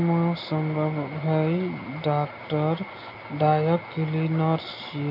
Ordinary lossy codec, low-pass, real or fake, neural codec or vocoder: AAC, 48 kbps; 5.4 kHz; real; none